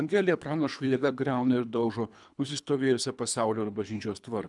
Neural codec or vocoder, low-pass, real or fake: codec, 24 kHz, 3 kbps, HILCodec; 10.8 kHz; fake